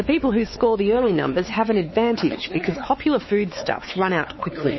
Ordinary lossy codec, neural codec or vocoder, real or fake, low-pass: MP3, 24 kbps; codec, 16 kHz, 4 kbps, X-Codec, WavLM features, trained on Multilingual LibriSpeech; fake; 7.2 kHz